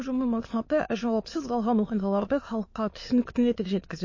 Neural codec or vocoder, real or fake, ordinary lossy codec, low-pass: autoencoder, 22.05 kHz, a latent of 192 numbers a frame, VITS, trained on many speakers; fake; MP3, 32 kbps; 7.2 kHz